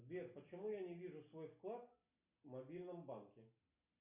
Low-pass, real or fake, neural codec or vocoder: 3.6 kHz; real; none